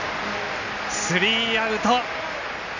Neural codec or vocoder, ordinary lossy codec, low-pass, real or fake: none; none; 7.2 kHz; real